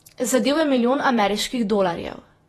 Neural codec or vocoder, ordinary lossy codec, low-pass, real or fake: vocoder, 48 kHz, 128 mel bands, Vocos; AAC, 32 kbps; 19.8 kHz; fake